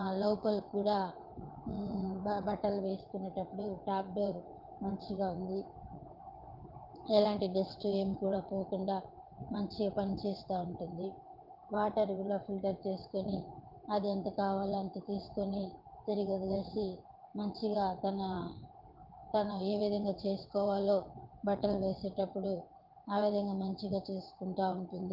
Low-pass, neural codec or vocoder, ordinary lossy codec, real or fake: 5.4 kHz; vocoder, 22.05 kHz, 80 mel bands, WaveNeXt; Opus, 32 kbps; fake